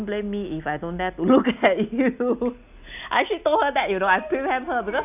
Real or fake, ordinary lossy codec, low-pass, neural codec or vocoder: real; none; 3.6 kHz; none